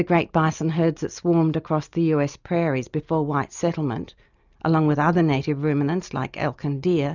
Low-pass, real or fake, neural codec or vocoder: 7.2 kHz; real; none